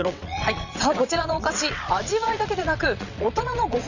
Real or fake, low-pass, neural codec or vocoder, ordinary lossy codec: fake; 7.2 kHz; vocoder, 22.05 kHz, 80 mel bands, WaveNeXt; none